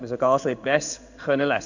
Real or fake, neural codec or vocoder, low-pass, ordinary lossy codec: fake; codec, 16 kHz in and 24 kHz out, 1 kbps, XY-Tokenizer; 7.2 kHz; none